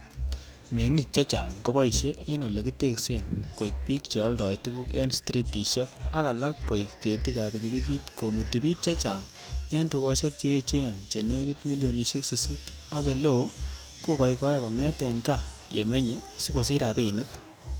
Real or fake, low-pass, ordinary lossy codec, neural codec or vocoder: fake; none; none; codec, 44.1 kHz, 2.6 kbps, DAC